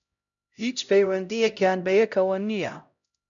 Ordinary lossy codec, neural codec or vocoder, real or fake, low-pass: MP3, 96 kbps; codec, 16 kHz, 0.5 kbps, X-Codec, HuBERT features, trained on LibriSpeech; fake; 7.2 kHz